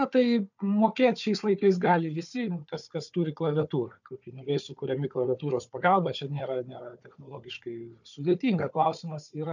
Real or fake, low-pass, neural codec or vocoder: fake; 7.2 kHz; codec, 16 kHz, 4 kbps, FunCodec, trained on Chinese and English, 50 frames a second